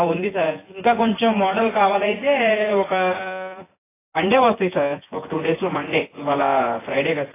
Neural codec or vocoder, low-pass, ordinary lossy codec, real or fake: vocoder, 24 kHz, 100 mel bands, Vocos; 3.6 kHz; AAC, 16 kbps; fake